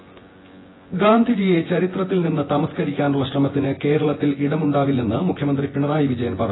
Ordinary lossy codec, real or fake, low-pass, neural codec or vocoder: AAC, 16 kbps; fake; 7.2 kHz; vocoder, 24 kHz, 100 mel bands, Vocos